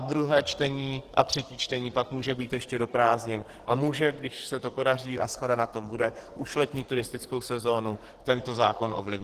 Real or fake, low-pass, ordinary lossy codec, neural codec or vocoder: fake; 14.4 kHz; Opus, 16 kbps; codec, 44.1 kHz, 2.6 kbps, SNAC